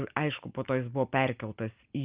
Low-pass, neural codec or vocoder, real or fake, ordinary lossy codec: 3.6 kHz; none; real; Opus, 24 kbps